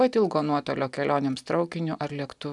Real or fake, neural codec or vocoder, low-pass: real; none; 10.8 kHz